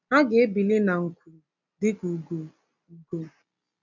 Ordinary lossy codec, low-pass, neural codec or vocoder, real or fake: none; 7.2 kHz; none; real